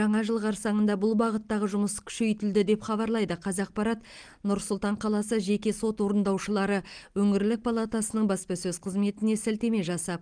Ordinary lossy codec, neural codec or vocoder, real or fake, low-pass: Opus, 24 kbps; none; real; 9.9 kHz